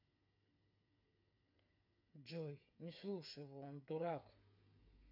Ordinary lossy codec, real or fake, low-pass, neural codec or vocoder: none; fake; 5.4 kHz; codec, 16 kHz, 8 kbps, FreqCodec, smaller model